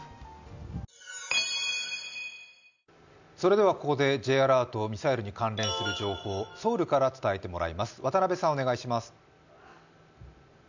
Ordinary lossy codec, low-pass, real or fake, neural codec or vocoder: none; 7.2 kHz; real; none